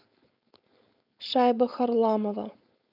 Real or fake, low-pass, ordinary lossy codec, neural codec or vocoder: fake; 5.4 kHz; none; codec, 16 kHz, 4.8 kbps, FACodec